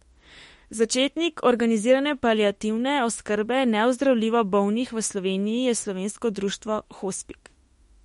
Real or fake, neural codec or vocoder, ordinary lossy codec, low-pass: fake; autoencoder, 48 kHz, 32 numbers a frame, DAC-VAE, trained on Japanese speech; MP3, 48 kbps; 19.8 kHz